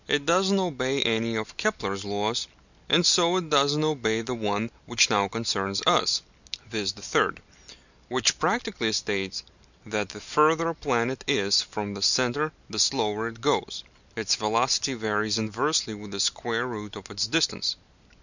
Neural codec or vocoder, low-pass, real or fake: none; 7.2 kHz; real